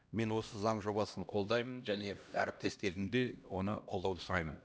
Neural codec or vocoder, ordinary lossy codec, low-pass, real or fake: codec, 16 kHz, 1 kbps, X-Codec, HuBERT features, trained on LibriSpeech; none; none; fake